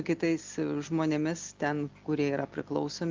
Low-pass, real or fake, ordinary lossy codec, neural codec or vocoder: 7.2 kHz; real; Opus, 32 kbps; none